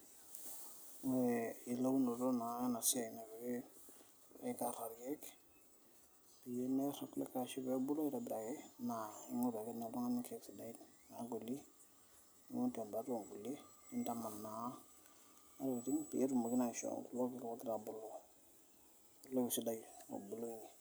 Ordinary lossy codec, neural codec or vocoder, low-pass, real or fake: none; none; none; real